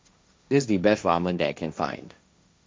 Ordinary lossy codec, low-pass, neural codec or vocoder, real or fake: none; none; codec, 16 kHz, 1.1 kbps, Voila-Tokenizer; fake